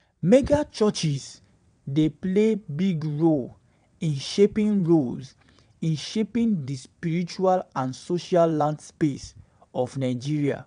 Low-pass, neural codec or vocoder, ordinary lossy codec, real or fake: 9.9 kHz; vocoder, 22.05 kHz, 80 mel bands, WaveNeXt; none; fake